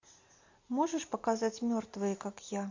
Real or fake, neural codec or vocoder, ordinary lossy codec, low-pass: real; none; MP3, 48 kbps; 7.2 kHz